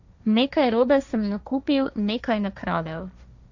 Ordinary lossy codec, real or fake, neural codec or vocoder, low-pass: none; fake; codec, 16 kHz, 1.1 kbps, Voila-Tokenizer; 7.2 kHz